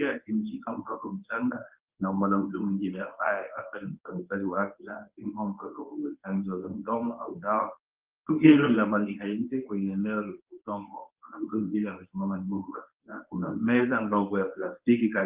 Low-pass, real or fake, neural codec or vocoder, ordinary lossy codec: 3.6 kHz; fake; codec, 24 kHz, 0.9 kbps, WavTokenizer, medium speech release version 1; Opus, 32 kbps